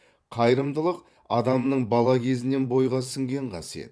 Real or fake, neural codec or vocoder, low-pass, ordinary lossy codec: fake; vocoder, 22.05 kHz, 80 mel bands, Vocos; none; none